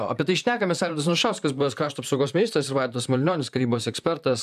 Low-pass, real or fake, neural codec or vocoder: 14.4 kHz; fake; vocoder, 44.1 kHz, 128 mel bands, Pupu-Vocoder